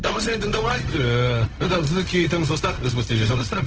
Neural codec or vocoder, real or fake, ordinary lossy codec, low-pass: codec, 16 kHz, 0.4 kbps, LongCat-Audio-Codec; fake; Opus, 16 kbps; 7.2 kHz